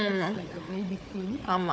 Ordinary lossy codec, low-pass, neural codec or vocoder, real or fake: none; none; codec, 16 kHz, 4 kbps, FunCodec, trained on Chinese and English, 50 frames a second; fake